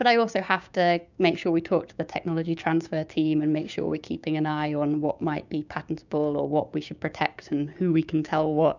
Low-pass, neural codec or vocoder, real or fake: 7.2 kHz; codec, 16 kHz, 6 kbps, DAC; fake